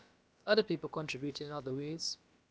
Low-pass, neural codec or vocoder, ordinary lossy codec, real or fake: none; codec, 16 kHz, about 1 kbps, DyCAST, with the encoder's durations; none; fake